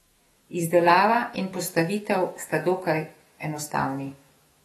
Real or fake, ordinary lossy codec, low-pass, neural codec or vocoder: fake; AAC, 32 kbps; 19.8 kHz; autoencoder, 48 kHz, 128 numbers a frame, DAC-VAE, trained on Japanese speech